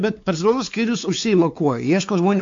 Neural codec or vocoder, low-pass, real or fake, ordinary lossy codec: codec, 16 kHz, 4 kbps, X-Codec, WavLM features, trained on Multilingual LibriSpeech; 7.2 kHz; fake; AAC, 64 kbps